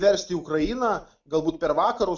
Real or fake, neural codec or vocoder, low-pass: real; none; 7.2 kHz